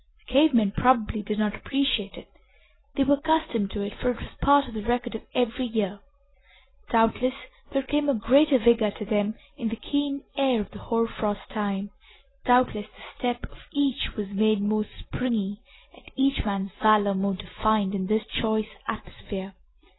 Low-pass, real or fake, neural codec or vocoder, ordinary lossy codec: 7.2 kHz; real; none; AAC, 16 kbps